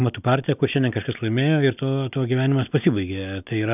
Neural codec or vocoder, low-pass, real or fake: none; 3.6 kHz; real